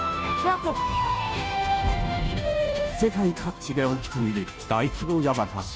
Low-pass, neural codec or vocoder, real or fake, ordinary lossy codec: none; codec, 16 kHz, 0.5 kbps, FunCodec, trained on Chinese and English, 25 frames a second; fake; none